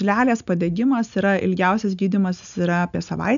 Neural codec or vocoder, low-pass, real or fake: none; 7.2 kHz; real